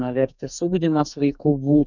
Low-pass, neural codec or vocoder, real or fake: 7.2 kHz; codec, 44.1 kHz, 2.6 kbps, SNAC; fake